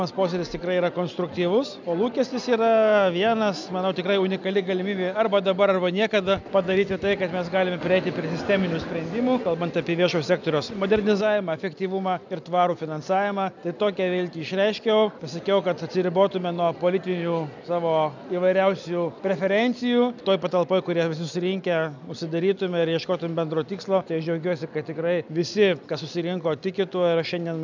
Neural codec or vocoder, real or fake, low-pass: none; real; 7.2 kHz